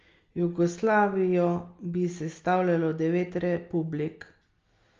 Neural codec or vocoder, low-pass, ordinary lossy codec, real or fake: none; 7.2 kHz; Opus, 32 kbps; real